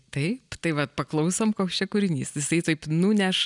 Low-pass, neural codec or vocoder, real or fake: 10.8 kHz; none; real